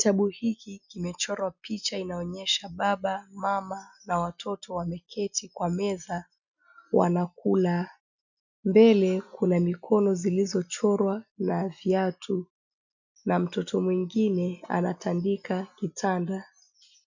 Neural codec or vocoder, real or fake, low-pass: none; real; 7.2 kHz